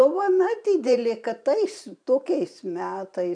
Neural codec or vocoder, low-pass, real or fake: vocoder, 44.1 kHz, 128 mel bands every 512 samples, BigVGAN v2; 9.9 kHz; fake